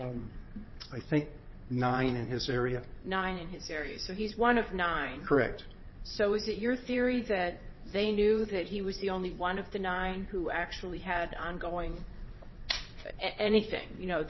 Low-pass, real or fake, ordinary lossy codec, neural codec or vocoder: 7.2 kHz; fake; MP3, 24 kbps; vocoder, 22.05 kHz, 80 mel bands, WaveNeXt